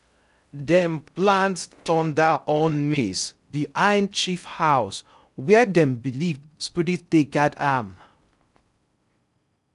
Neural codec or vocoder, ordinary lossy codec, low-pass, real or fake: codec, 16 kHz in and 24 kHz out, 0.6 kbps, FocalCodec, streaming, 2048 codes; none; 10.8 kHz; fake